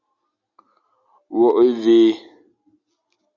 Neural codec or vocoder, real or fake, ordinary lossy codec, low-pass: none; real; Opus, 64 kbps; 7.2 kHz